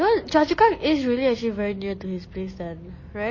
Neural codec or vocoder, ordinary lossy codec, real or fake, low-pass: none; MP3, 32 kbps; real; 7.2 kHz